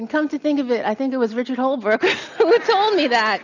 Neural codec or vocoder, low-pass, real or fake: none; 7.2 kHz; real